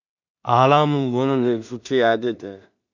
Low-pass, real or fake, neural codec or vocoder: 7.2 kHz; fake; codec, 16 kHz in and 24 kHz out, 0.4 kbps, LongCat-Audio-Codec, two codebook decoder